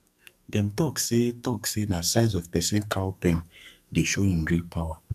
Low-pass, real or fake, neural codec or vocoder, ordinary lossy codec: 14.4 kHz; fake; codec, 44.1 kHz, 2.6 kbps, SNAC; none